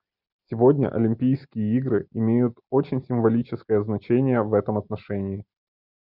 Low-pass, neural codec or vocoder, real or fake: 5.4 kHz; none; real